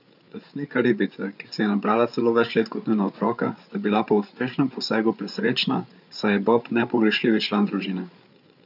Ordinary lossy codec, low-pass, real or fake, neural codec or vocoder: none; 5.4 kHz; fake; codec, 16 kHz, 8 kbps, FreqCodec, larger model